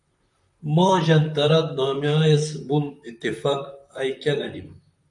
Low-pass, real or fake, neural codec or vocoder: 10.8 kHz; fake; vocoder, 44.1 kHz, 128 mel bands, Pupu-Vocoder